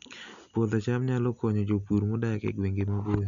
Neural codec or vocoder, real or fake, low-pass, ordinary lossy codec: none; real; 7.2 kHz; none